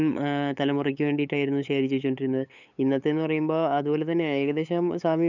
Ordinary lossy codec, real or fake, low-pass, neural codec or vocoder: none; fake; 7.2 kHz; codec, 16 kHz, 8 kbps, FunCodec, trained on LibriTTS, 25 frames a second